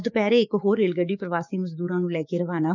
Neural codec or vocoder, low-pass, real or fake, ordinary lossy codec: codec, 16 kHz, 6 kbps, DAC; 7.2 kHz; fake; none